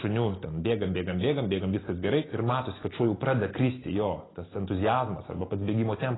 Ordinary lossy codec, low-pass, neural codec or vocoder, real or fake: AAC, 16 kbps; 7.2 kHz; none; real